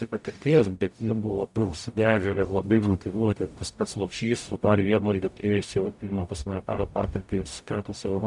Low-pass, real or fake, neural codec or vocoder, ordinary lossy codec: 10.8 kHz; fake; codec, 44.1 kHz, 0.9 kbps, DAC; MP3, 96 kbps